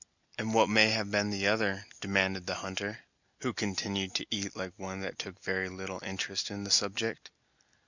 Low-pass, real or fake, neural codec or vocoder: 7.2 kHz; real; none